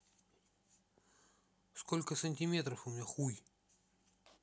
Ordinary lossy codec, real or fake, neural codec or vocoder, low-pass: none; real; none; none